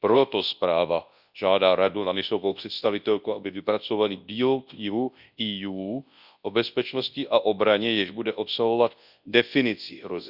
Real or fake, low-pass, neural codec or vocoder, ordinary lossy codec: fake; 5.4 kHz; codec, 24 kHz, 0.9 kbps, WavTokenizer, large speech release; Opus, 64 kbps